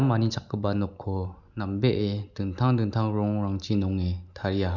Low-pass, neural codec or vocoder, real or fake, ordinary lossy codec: 7.2 kHz; none; real; none